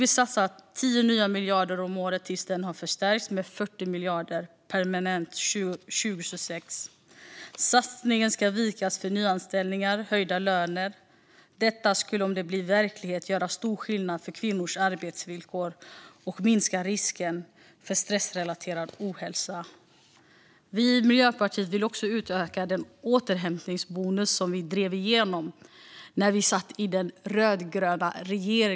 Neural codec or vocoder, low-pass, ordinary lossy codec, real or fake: none; none; none; real